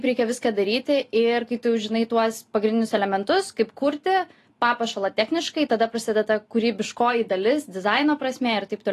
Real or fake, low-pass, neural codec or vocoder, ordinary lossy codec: real; 14.4 kHz; none; AAC, 48 kbps